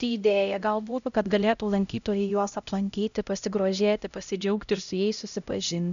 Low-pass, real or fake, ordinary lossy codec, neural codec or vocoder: 7.2 kHz; fake; MP3, 96 kbps; codec, 16 kHz, 0.5 kbps, X-Codec, HuBERT features, trained on LibriSpeech